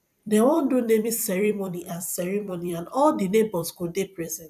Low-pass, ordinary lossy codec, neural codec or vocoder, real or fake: 14.4 kHz; none; vocoder, 44.1 kHz, 128 mel bands every 256 samples, BigVGAN v2; fake